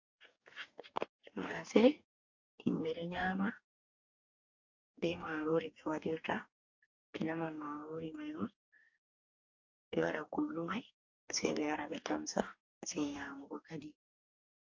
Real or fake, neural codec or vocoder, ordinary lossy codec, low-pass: fake; codec, 44.1 kHz, 2.6 kbps, DAC; AAC, 48 kbps; 7.2 kHz